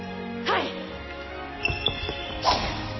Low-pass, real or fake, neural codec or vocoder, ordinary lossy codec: 7.2 kHz; real; none; MP3, 24 kbps